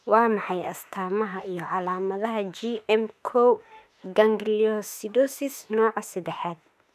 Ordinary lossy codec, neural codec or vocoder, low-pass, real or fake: none; autoencoder, 48 kHz, 32 numbers a frame, DAC-VAE, trained on Japanese speech; 14.4 kHz; fake